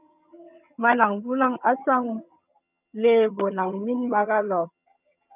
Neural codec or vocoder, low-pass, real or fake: vocoder, 22.05 kHz, 80 mel bands, HiFi-GAN; 3.6 kHz; fake